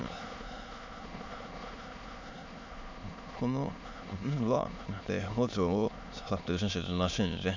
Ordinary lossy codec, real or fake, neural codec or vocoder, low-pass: MP3, 64 kbps; fake; autoencoder, 22.05 kHz, a latent of 192 numbers a frame, VITS, trained on many speakers; 7.2 kHz